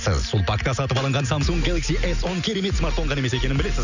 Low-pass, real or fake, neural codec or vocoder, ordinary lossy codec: 7.2 kHz; real; none; none